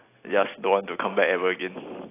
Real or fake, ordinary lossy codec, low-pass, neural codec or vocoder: real; AAC, 24 kbps; 3.6 kHz; none